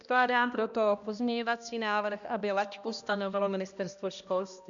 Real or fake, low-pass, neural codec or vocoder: fake; 7.2 kHz; codec, 16 kHz, 1 kbps, X-Codec, HuBERT features, trained on balanced general audio